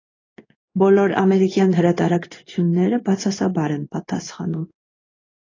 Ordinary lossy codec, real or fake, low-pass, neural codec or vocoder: AAC, 32 kbps; fake; 7.2 kHz; codec, 16 kHz in and 24 kHz out, 1 kbps, XY-Tokenizer